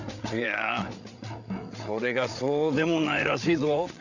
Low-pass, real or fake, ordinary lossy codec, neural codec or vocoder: 7.2 kHz; fake; none; codec, 16 kHz, 8 kbps, FreqCodec, larger model